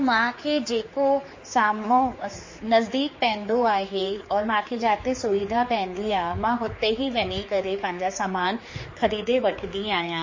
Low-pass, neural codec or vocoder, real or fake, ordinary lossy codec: 7.2 kHz; codec, 16 kHz, 4 kbps, X-Codec, HuBERT features, trained on general audio; fake; MP3, 32 kbps